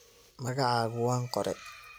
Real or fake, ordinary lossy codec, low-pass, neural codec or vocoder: real; none; none; none